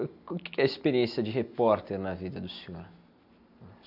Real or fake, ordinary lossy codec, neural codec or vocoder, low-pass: real; none; none; 5.4 kHz